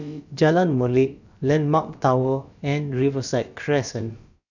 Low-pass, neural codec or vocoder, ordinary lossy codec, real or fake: 7.2 kHz; codec, 16 kHz, about 1 kbps, DyCAST, with the encoder's durations; none; fake